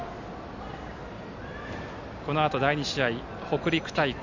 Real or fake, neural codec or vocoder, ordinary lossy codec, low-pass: real; none; none; 7.2 kHz